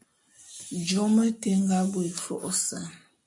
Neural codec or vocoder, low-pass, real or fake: none; 10.8 kHz; real